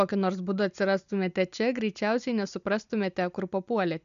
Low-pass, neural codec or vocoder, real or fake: 7.2 kHz; none; real